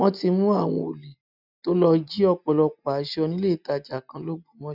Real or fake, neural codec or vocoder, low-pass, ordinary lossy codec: real; none; 5.4 kHz; none